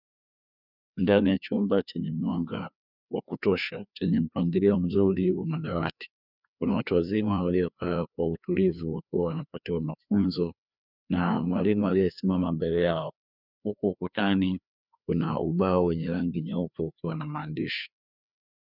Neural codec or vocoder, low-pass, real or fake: codec, 16 kHz, 2 kbps, FreqCodec, larger model; 5.4 kHz; fake